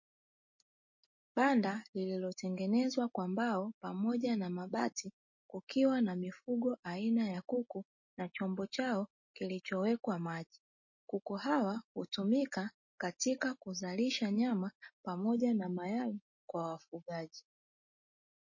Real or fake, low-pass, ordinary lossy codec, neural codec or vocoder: real; 7.2 kHz; MP3, 32 kbps; none